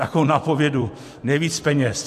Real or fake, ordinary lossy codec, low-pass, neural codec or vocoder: fake; MP3, 64 kbps; 14.4 kHz; vocoder, 44.1 kHz, 128 mel bands every 256 samples, BigVGAN v2